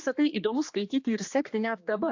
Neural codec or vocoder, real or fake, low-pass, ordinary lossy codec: codec, 16 kHz, 2 kbps, X-Codec, HuBERT features, trained on general audio; fake; 7.2 kHz; AAC, 48 kbps